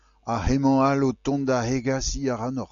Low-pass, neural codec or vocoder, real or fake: 7.2 kHz; none; real